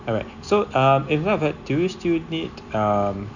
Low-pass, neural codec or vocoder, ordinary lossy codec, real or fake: 7.2 kHz; none; none; real